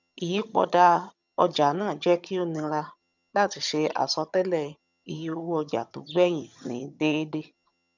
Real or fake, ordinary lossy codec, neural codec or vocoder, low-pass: fake; none; vocoder, 22.05 kHz, 80 mel bands, HiFi-GAN; 7.2 kHz